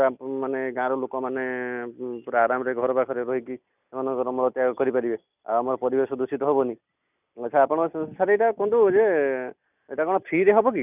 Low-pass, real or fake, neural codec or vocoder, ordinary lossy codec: 3.6 kHz; real; none; none